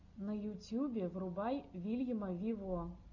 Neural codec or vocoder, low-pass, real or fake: none; 7.2 kHz; real